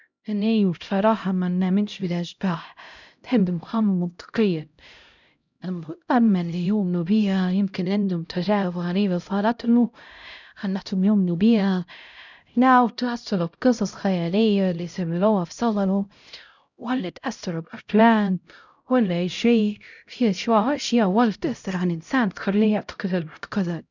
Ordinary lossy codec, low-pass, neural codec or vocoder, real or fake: none; 7.2 kHz; codec, 16 kHz, 0.5 kbps, X-Codec, HuBERT features, trained on LibriSpeech; fake